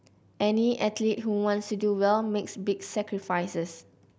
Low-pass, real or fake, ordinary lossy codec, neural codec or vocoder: none; real; none; none